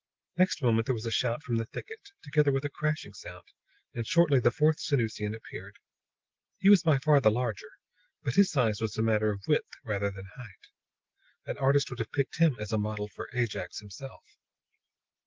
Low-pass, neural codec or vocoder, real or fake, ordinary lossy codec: 7.2 kHz; none; real; Opus, 16 kbps